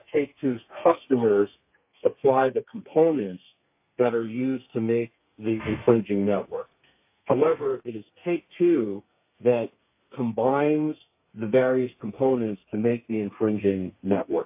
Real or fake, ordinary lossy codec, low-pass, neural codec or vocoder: fake; AAC, 32 kbps; 3.6 kHz; codec, 32 kHz, 1.9 kbps, SNAC